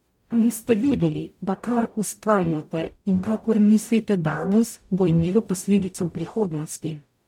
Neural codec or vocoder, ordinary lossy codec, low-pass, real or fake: codec, 44.1 kHz, 0.9 kbps, DAC; MP3, 96 kbps; 19.8 kHz; fake